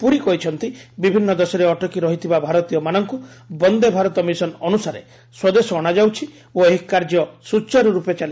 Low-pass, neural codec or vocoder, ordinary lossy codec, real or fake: none; none; none; real